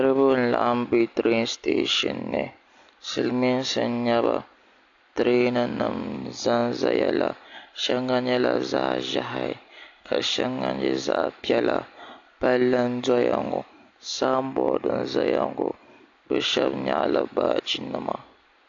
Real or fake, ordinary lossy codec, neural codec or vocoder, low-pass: real; AAC, 48 kbps; none; 7.2 kHz